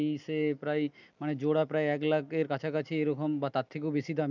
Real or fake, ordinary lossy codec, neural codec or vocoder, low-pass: real; none; none; 7.2 kHz